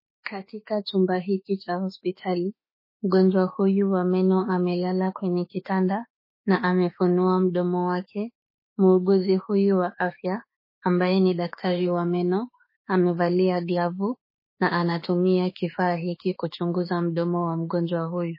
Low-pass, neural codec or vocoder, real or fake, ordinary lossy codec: 5.4 kHz; autoencoder, 48 kHz, 32 numbers a frame, DAC-VAE, trained on Japanese speech; fake; MP3, 24 kbps